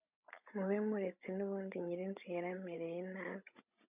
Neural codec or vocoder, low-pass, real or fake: codec, 16 kHz, 16 kbps, FreqCodec, larger model; 3.6 kHz; fake